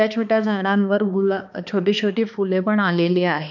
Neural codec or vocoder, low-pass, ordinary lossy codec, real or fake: codec, 16 kHz, 2 kbps, X-Codec, HuBERT features, trained on balanced general audio; 7.2 kHz; none; fake